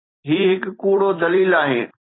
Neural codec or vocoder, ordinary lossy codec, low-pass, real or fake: vocoder, 44.1 kHz, 128 mel bands every 256 samples, BigVGAN v2; AAC, 16 kbps; 7.2 kHz; fake